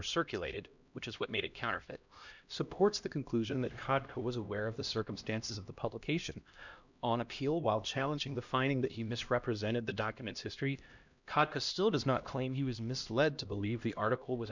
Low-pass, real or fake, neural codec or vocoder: 7.2 kHz; fake; codec, 16 kHz, 1 kbps, X-Codec, HuBERT features, trained on LibriSpeech